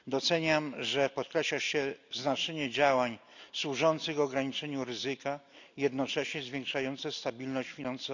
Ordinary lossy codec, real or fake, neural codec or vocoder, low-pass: none; real; none; 7.2 kHz